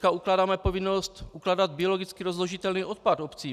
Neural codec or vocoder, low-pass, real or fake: none; 14.4 kHz; real